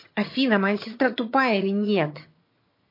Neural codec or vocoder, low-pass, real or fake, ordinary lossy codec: vocoder, 22.05 kHz, 80 mel bands, HiFi-GAN; 5.4 kHz; fake; MP3, 32 kbps